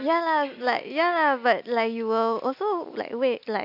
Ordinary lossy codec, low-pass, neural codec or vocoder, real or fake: none; 5.4 kHz; none; real